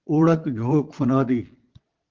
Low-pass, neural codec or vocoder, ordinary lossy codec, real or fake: 7.2 kHz; vocoder, 22.05 kHz, 80 mel bands, Vocos; Opus, 16 kbps; fake